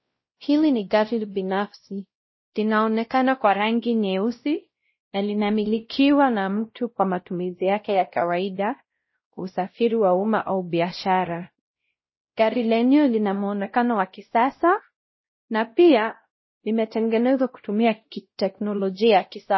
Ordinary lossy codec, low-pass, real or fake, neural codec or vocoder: MP3, 24 kbps; 7.2 kHz; fake; codec, 16 kHz, 0.5 kbps, X-Codec, WavLM features, trained on Multilingual LibriSpeech